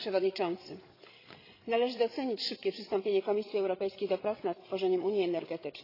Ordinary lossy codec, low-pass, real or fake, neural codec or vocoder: AAC, 24 kbps; 5.4 kHz; fake; codec, 16 kHz, 16 kbps, FreqCodec, larger model